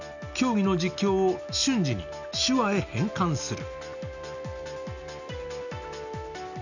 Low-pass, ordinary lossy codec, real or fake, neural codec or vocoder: 7.2 kHz; none; real; none